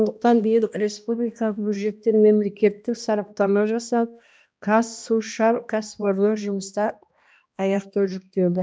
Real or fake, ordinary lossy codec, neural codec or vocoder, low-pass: fake; none; codec, 16 kHz, 1 kbps, X-Codec, HuBERT features, trained on balanced general audio; none